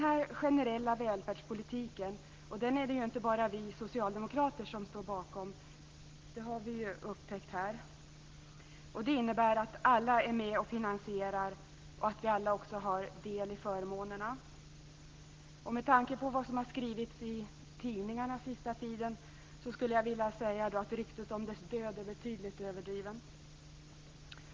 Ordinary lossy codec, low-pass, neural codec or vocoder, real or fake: Opus, 16 kbps; 7.2 kHz; none; real